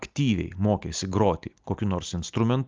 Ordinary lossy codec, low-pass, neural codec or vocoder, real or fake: Opus, 24 kbps; 7.2 kHz; none; real